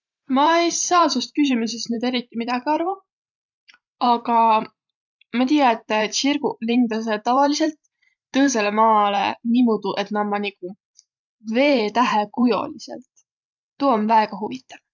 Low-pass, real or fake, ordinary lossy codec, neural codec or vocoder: 7.2 kHz; fake; none; vocoder, 44.1 kHz, 128 mel bands every 512 samples, BigVGAN v2